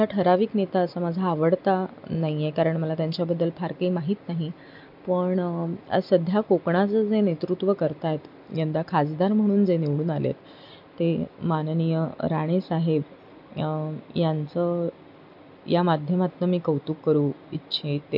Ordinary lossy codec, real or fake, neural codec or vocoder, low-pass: MP3, 48 kbps; real; none; 5.4 kHz